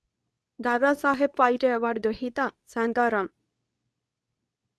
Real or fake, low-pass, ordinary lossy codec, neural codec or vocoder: fake; none; none; codec, 24 kHz, 0.9 kbps, WavTokenizer, medium speech release version 2